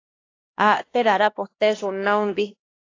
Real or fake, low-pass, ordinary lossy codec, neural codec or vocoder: fake; 7.2 kHz; AAC, 32 kbps; codec, 16 kHz, 1 kbps, X-Codec, HuBERT features, trained on LibriSpeech